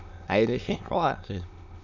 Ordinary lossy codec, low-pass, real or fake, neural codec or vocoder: none; 7.2 kHz; fake; autoencoder, 22.05 kHz, a latent of 192 numbers a frame, VITS, trained on many speakers